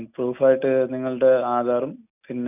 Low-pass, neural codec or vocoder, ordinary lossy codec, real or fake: 3.6 kHz; none; none; real